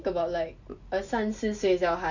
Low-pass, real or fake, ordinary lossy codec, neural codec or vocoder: 7.2 kHz; real; none; none